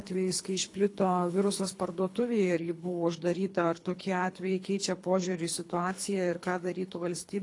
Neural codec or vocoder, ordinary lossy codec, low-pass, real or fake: codec, 24 kHz, 3 kbps, HILCodec; AAC, 48 kbps; 10.8 kHz; fake